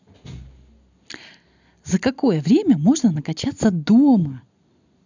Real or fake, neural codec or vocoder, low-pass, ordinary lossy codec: real; none; 7.2 kHz; none